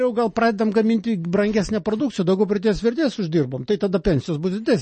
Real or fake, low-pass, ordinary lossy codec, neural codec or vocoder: real; 10.8 kHz; MP3, 32 kbps; none